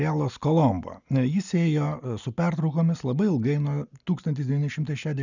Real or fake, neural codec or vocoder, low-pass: real; none; 7.2 kHz